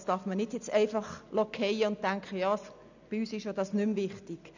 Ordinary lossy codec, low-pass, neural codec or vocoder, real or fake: none; 7.2 kHz; none; real